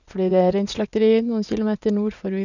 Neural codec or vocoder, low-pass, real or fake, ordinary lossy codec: vocoder, 44.1 kHz, 80 mel bands, Vocos; 7.2 kHz; fake; none